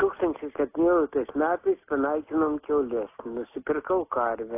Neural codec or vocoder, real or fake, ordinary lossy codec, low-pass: none; real; AAC, 24 kbps; 3.6 kHz